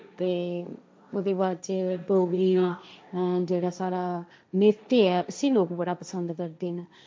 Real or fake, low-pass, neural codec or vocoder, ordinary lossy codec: fake; none; codec, 16 kHz, 1.1 kbps, Voila-Tokenizer; none